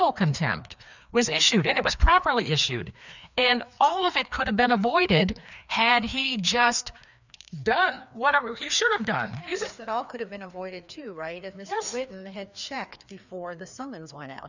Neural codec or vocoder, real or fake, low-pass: codec, 16 kHz, 2 kbps, FreqCodec, larger model; fake; 7.2 kHz